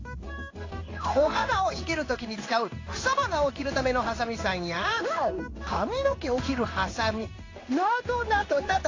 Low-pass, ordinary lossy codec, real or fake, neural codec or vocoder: 7.2 kHz; AAC, 32 kbps; fake; codec, 16 kHz in and 24 kHz out, 1 kbps, XY-Tokenizer